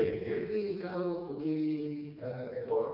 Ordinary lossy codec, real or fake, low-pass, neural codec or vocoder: none; fake; 5.4 kHz; codec, 16 kHz, 2 kbps, FreqCodec, smaller model